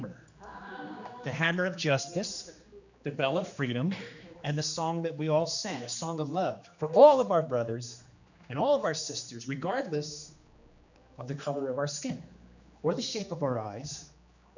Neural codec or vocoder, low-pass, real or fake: codec, 16 kHz, 2 kbps, X-Codec, HuBERT features, trained on general audio; 7.2 kHz; fake